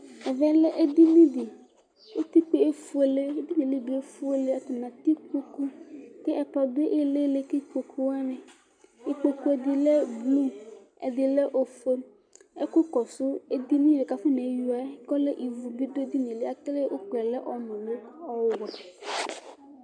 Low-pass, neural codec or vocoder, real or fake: 9.9 kHz; none; real